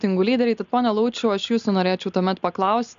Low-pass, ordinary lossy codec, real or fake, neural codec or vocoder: 7.2 kHz; MP3, 64 kbps; real; none